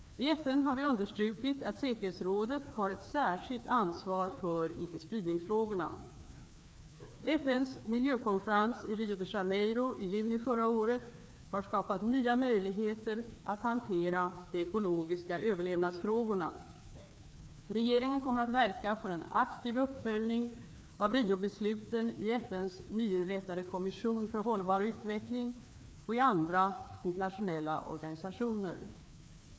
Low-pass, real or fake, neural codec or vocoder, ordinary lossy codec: none; fake; codec, 16 kHz, 2 kbps, FreqCodec, larger model; none